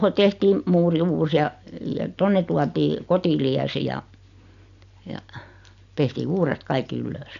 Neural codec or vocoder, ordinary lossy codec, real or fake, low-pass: none; none; real; 7.2 kHz